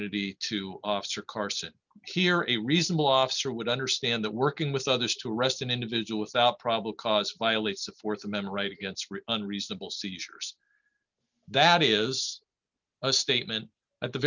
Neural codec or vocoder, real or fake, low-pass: none; real; 7.2 kHz